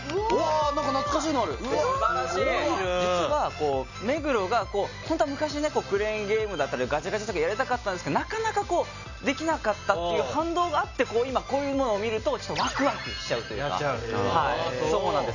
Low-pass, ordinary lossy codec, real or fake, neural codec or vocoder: 7.2 kHz; none; real; none